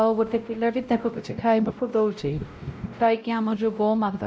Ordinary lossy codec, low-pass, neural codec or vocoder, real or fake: none; none; codec, 16 kHz, 0.5 kbps, X-Codec, WavLM features, trained on Multilingual LibriSpeech; fake